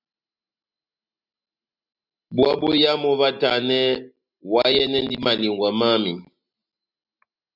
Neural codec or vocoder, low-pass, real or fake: none; 5.4 kHz; real